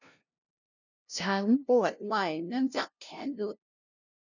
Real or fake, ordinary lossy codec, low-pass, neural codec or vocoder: fake; none; 7.2 kHz; codec, 16 kHz, 0.5 kbps, FunCodec, trained on LibriTTS, 25 frames a second